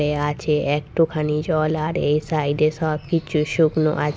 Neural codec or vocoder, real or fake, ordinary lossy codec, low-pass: none; real; none; none